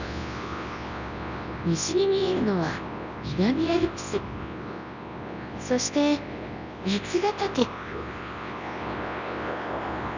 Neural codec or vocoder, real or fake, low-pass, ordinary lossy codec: codec, 24 kHz, 0.9 kbps, WavTokenizer, large speech release; fake; 7.2 kHz; none